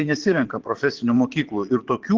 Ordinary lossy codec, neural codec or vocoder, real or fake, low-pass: Opus, 16 kbps; vocoder, 22.05 kHz, 80 mel bands, Vocos; fake; 7.2 kHz